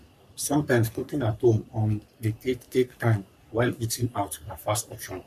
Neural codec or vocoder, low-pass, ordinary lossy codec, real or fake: codec, 44.1 kHz, 3.4 kbps, Pupu-Codec; 14.4 kHz; none; fake